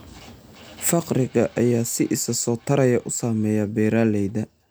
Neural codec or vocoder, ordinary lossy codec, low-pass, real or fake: none; none; none; real